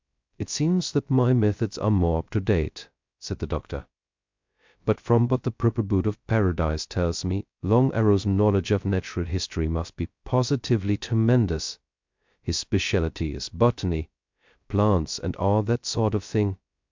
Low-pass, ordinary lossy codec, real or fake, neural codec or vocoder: 7.2 kHz; MP3, 64 kbps; fake; codec, 16 kHz, 0.2 kbps, FocalCodec